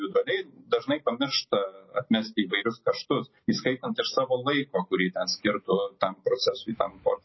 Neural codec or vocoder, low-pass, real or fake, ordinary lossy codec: none; 7.2 kHz; real; MP3, 24 kbps